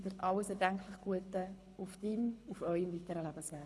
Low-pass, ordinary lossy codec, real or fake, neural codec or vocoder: none; none; fake; codec, 24 kHz, 6 kbps, HILCodec